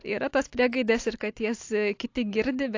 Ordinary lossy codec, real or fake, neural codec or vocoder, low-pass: AAC, 48 kbps; real; none; 7.2 kHz